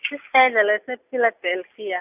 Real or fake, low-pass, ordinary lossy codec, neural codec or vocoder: real; 3.6 kHz; AAC, 32 kbps; none